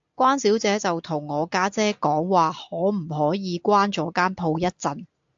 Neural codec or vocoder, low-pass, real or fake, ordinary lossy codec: none; 7.2 kHz; real; AAC, 64 kbps